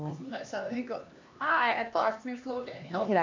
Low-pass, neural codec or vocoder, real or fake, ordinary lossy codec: 7.2 kHz; codec, 16 kHz, 2 kbps, X-Codec, HuBERT features, trained on LibriSpeech; fake; MP3, 64 kbps